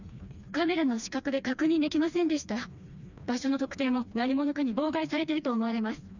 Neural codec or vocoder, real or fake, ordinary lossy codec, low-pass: codec, 16 kHz, 2 kbps, FreqCodec, smaller model; fake; none; 7.2 kHz